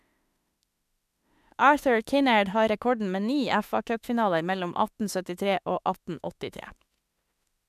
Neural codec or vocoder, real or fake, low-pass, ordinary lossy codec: autoencoder, 48 kHz, 32 numbers a frame, DAC-VAE, trained on Japanese speech; fake; 14.4 kHz; MP3, 96 kbps